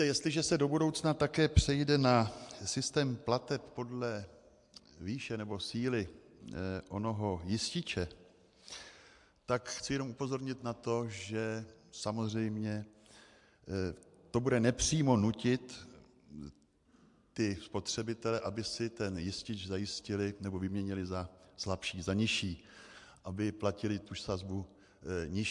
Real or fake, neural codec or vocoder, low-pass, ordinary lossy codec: real; none; 10.8 kHz; MP3, 64 kbps